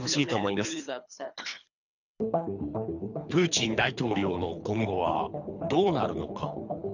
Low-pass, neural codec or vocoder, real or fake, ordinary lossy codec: 7.2 kHz; codec, 24 kHz, 3 kbps, HILCodec; fake; none